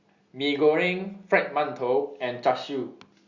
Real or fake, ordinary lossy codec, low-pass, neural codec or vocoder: real; Opus, 64 kbps; 7.2 kHz; none